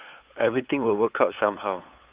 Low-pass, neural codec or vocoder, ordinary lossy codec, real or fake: 3.6 kHz; codec, 16 kHz, 8 kbps, FunCodec, trained on LibriTTS, 25 frames a second; Opus, 24 kbps; fake